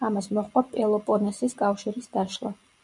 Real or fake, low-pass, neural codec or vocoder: real; 10.8 kHz; none